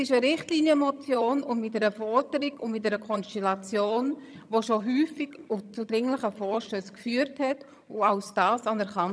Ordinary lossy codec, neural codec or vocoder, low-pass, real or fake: none; vocoder, 22.05 kHz, 80 mel bands, HiFi-GAN; none; fake